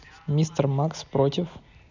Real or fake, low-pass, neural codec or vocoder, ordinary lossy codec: real; 7.2 kHz; none; none